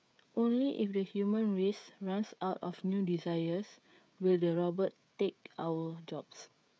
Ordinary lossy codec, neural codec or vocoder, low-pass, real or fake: none; codec, 16 kHz, 16 kbps, FreqCodec, smaller model; none; fake